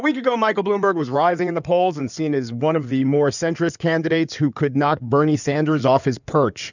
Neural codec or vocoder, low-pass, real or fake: codec, 16 kHz in and 24 kHz out, 2.2 kbps, FireRedTTS-2 codec; 7.2 kHz; fake